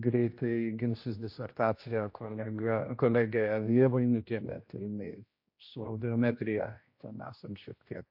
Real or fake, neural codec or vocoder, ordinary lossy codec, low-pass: fake; codec, 16 kHz, 1 kbps, X-Codec, HuBERT features, trained on general audio; MP3, 32 kbps; 5.4 kHz